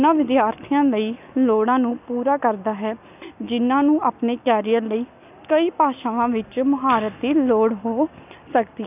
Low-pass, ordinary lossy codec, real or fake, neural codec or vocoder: 3.6 kHz; none; real; none